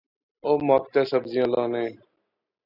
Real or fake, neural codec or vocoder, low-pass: real; none; 5.4 kHz